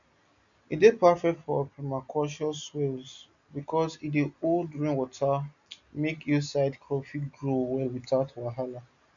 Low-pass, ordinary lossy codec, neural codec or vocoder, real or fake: 7.2 kHz; none; none; real